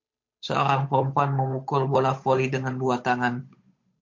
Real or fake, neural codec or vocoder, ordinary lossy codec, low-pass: fake; codec, 16 kHz, 8 kbps, FunCodec, trained on Chinese and English, 25 frames a second; MP3, 48 kbps; 7.2 kHz